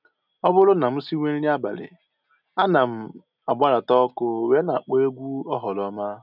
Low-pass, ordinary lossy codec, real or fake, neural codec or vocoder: 5.4 kHz; none; real; none